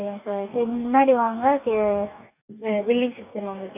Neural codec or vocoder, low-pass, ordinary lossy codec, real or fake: codec, 44.1 kHz, 2.6 kbps, DAC; 3.6 kHz; none; fake